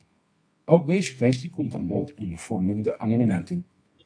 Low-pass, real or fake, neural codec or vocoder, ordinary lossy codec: 9.9 kHz; fake; codec, 24 kHz, 0.9 kbps, WavTokenizer, medium music audio release; AAC, 64 kbps